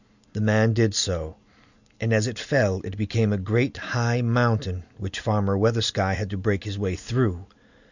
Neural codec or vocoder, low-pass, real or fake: none; 7.2 kHz; real